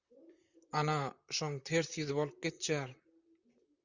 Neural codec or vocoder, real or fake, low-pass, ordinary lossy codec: vocoder, 44.1 kHz, 128 mel bands, Pupu-Vocoder; fake; 7.2 kHz; Opus, 64 kbps